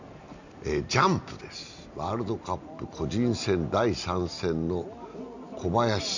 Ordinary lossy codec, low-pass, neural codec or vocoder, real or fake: none; 7.2 kHz; none; real